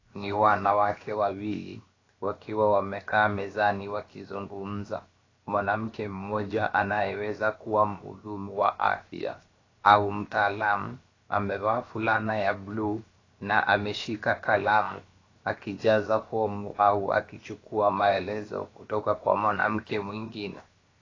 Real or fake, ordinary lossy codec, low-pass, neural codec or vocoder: fake; AAC, 32 kbps; 7.2 kHz; codec, 16 kHz, 0.7 kbps, FocalCodec